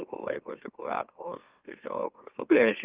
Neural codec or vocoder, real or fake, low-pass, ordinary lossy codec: autoencoder, 44.1 kHz, a latent of 192 numbers a frame, MeloTTS; fake; 3.6 kHz; Opus, 32 kbps